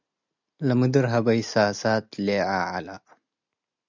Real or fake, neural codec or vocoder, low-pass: real; none; 7.2 kHz